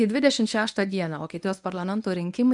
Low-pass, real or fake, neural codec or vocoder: 10.8 kHz; fake; codec, 24 kHz, 0.9 kbps, WavTokenizer, medium speech release version 1